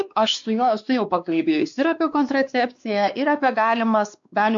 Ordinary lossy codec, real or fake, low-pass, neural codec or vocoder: MP3, 64 kbps; fake; 7.2 kHz; codec, 16 kHz, 2 kbps, X-Codec, WavLM features, trained on Multilingual LibriSpeech